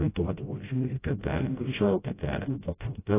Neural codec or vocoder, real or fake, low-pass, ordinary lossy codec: codec, 16 kHz, 0.5 kbps, FreqCodec, smaller model; fake; 3.6 kHz; AAC, 16 kbps